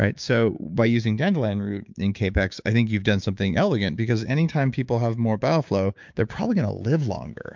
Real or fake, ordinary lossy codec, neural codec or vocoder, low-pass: fake; MP3, 64 kbps; codec, 16 kHz, 6 kbps, DAC; 7.2 kHz